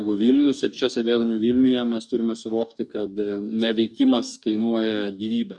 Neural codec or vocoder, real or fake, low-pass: codec, 44.1 kHz, 2.6 kbps, DAC; fake; 10.8 kHz